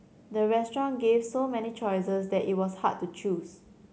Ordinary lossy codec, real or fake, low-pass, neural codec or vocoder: none; real; none; none